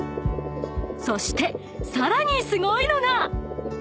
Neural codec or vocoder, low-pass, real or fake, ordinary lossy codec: none; none; real; none